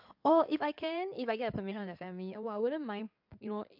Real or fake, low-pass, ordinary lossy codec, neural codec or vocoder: fake; 5.4 kHz; MP3, 48 kbps; codec, 16 kHz in and 24 kHz out, 2.2 kbps, FireRedTTS-2 codec